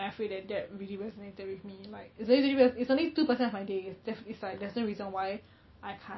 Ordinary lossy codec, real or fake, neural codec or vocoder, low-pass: MP3, 24 kbps; real; none; 7.2 kHz